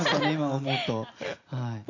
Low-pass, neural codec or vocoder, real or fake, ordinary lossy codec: 7.2 kHz; none; real; none